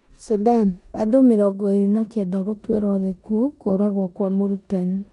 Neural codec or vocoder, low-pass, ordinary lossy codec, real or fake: codec, 16 kHz in and 24 kHz out, 0.9 kbps, LongCat-Audio-Codec, four codebook decoder; 10.8 kHz; none; fake